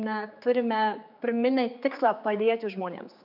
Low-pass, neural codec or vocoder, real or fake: 5.4 kHz; codec, 16 kHz, 4 kbps, X-Codec, HuBERT features, trained on general audio; fake